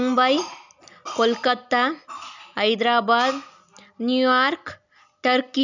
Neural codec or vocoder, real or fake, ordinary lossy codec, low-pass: none; real; none; 7.2 kHz